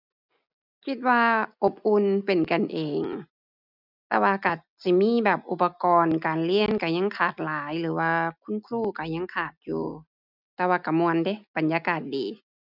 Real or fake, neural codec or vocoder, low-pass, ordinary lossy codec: real; none; 5.4 kHz; none